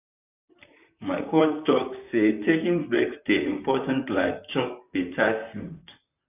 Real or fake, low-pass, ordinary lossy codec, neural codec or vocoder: fake; 3.6 kHz; none; vocoder, 44.1 kHz, 128 mel bands, Pupu-Vocoder